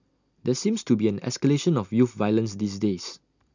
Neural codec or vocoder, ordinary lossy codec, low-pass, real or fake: none; none; 7.2 kHz; real